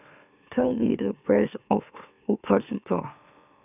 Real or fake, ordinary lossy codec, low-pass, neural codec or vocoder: fake; none; 3.6 kHz; autoencoder, 44.1 kHz, a latent of 192 numbers a frame, MeloTTS